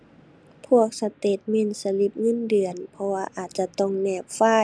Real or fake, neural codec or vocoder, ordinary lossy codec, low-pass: real; none; none; none